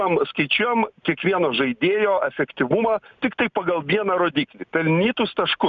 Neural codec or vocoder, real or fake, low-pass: none; real; 7.2 kHz